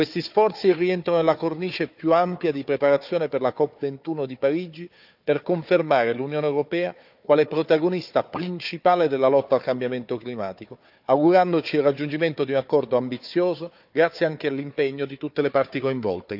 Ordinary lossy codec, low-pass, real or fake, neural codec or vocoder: none; 5.4 kHz; fake; codec, 16 kHz, 4 kbps, FunCodec, trained on Chinese and English, 50 frames a second